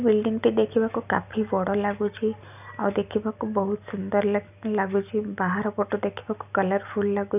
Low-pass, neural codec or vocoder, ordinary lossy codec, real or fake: 3.6 kHz; none; none; real